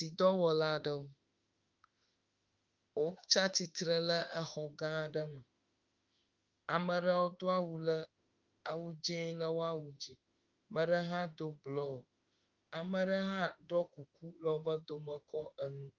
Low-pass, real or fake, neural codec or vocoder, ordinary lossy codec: 7.2 kHz; fake; autoencoder, 48 kHz, 32 numbers a frame, DAC-VAE, trained on Japanese speech; Opus, 24 kbps